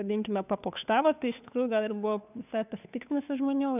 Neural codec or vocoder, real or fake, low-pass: codec, 16 kHz, 2 kbps, FunCodec, trained on Chinese and English, 25 frames a second; fake; 3.6 kHz